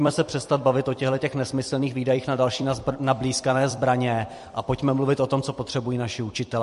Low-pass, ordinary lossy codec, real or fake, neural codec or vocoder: 14.4 kHz; MP3, 48 kbps; fake; vocoder, 44.1 kHz, 128 mel bands every 256 samples, BigVGAN v2